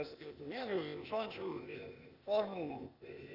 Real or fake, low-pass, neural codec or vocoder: fake; 5.4 kHz; codec, 16 kHz, 0.8 kbps, ZipCodec